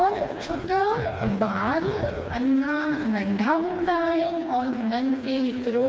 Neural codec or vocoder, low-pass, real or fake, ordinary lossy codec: codec, 16 kHz, 2 kbps, FreqCodec, smaller model; none; fake; none